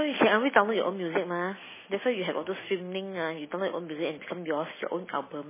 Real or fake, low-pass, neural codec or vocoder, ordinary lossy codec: real; 3.6 kHz; none; MP3, 16 kbps